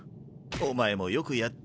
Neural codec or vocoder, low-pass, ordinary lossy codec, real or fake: none; none; none; real